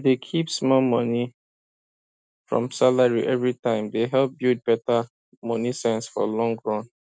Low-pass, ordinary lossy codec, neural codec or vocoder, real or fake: none; none; none; real